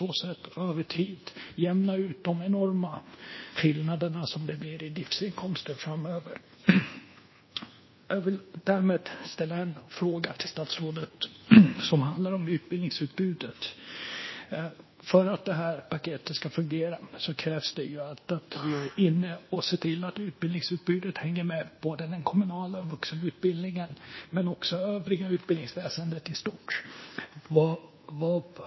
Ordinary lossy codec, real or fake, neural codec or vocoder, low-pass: MP3, 24 kbps; fake; codec, 24 kHz, 1.2 kbps, DualCodec; 7.2 kHz